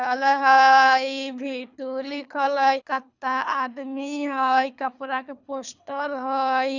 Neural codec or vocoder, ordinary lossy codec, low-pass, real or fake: codec, 24 kHz, 3 kbps, HILCodec; none; 7.2 kHz; fake